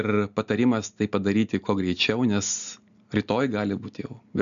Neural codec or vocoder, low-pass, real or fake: none; 7.2 kHz; real